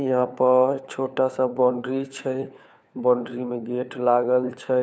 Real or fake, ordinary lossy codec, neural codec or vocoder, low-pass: fake; none; codec, 16 kHz, 4 kbps, FunCodec, trained on LibriTTS, 50 frames a second; none